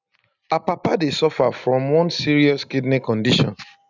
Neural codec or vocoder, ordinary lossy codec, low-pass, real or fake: none; none; 7.2 kHz; real